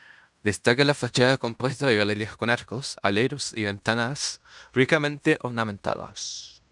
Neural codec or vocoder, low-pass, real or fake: codec, 16 kHz in and 24 kHz out, 0.9 kbps, LongCat-Audio-Codec, fine tuned four codebook decoder; 10.8 kHz; fake